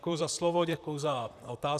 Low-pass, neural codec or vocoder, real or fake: 14.4 kHz; vocoder, 44.1 kHz, 128 mel bands, Pupu-Vocoder; fake